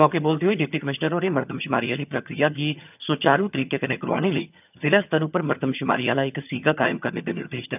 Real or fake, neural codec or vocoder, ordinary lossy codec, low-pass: fake; vocoder, 22.05 kHz, 80 mel bands, HiFi-GAN; none; 3.6 kHz